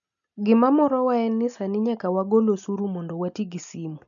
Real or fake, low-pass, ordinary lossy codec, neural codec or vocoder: real; 7.2 kHz; none; none